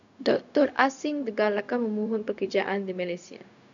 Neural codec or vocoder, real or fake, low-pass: codec, 16 kHz, 0.4 kbps, LongCat-Audio-Codec; fake; 7.2 kHz